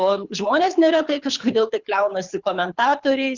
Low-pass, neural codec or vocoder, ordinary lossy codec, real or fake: 7.2 kHz; codec, 24 kHz, 6 kbps, HILCodec; Opus, 64 kbps; fake